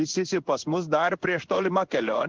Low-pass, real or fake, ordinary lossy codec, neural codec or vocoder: 7.2 kHz; fake; Opus, 16 kbps; codec, 16 kHz in and 24 kHz out, 1 kbps, XY-Tokenizer